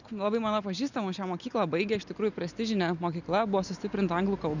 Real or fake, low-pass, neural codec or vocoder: real; 7.2 kHz; none